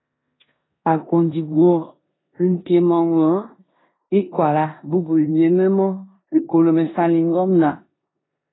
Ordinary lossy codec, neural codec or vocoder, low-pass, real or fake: AAC, 16 kbps; codec, 16 kHz in and 24 kHz out, 0.9 kbps, LongCat-Audio-Codec, four codebook decoder; 7.2 kHz; fake